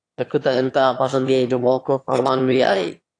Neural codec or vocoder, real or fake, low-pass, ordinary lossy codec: autoencoder, 22.05 kHz, a latent of 192 numbers a frame, VITS, trained on one speaker; fake; 9.9 kHz; AAC, 48 kbps